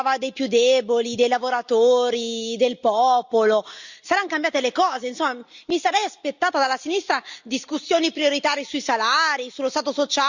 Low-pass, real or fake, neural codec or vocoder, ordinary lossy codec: 7.2 kHz; real; none; Opus, 64 kbps